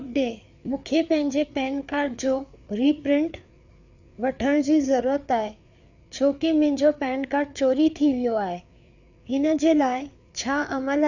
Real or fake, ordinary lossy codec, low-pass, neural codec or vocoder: fake; none; 7.2 kHz; codec, 16 kHz, 4 kbps, FreqCodec, larger model